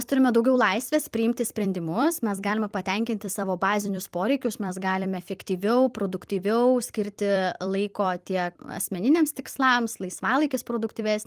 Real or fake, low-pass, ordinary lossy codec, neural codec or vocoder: fake; 14.4 kHz; Opus, 32 kbps; vocoder, 44.1 kHz, 128 mel bands every 256 samples, BigVGAN v2